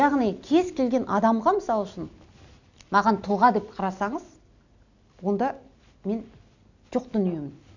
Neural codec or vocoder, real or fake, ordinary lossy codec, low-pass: none; real; none; 7.2 kHz